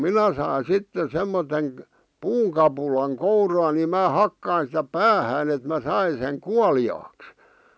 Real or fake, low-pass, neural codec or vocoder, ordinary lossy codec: real; none; none; none